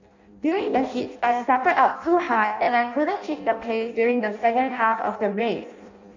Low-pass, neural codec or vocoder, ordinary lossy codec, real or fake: 7.2 kHz; codec, 16 kHz in and 24 kHz out, 0.6 kbps, FireRedTTS-2 codec; none; fake